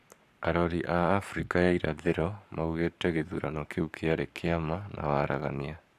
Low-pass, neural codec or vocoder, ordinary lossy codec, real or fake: 14.4 kHz; codec, 44.1 kHz, 7.8 kbps, Pupu-Codec; none; fake